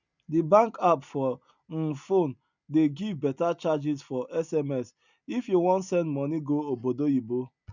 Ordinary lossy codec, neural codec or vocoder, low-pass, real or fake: none; none; 7.2 kHz; real